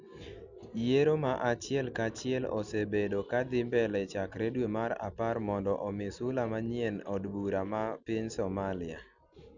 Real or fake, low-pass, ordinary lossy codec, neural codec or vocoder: real; 7.2 kHz; none; none